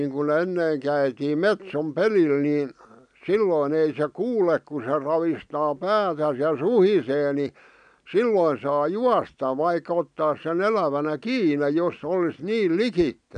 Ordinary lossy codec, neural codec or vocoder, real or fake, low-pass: none; none; real; 9.9 kHz